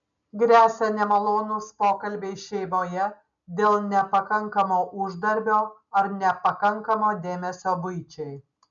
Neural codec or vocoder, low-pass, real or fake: none; 7.2 kHz; real